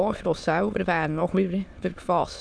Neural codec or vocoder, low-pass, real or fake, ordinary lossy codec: autoencoder, 22.05 kHz, a latent of 192 numbers a frame, VITS, trained on many speakers; none; fake; none